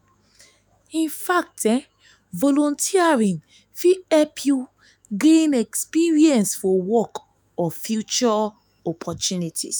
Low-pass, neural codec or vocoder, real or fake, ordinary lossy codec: none; autoencoder, 48 kHz, 128 numbers a frame, DAC-VAE, trained on Japanese speech; fake; none